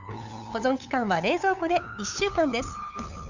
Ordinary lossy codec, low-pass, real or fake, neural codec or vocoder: none; 7.2 kHz; fake; codec, 16 kHz, 8 kbps, FunCodec, trained on LibriTTS, 25 frames a second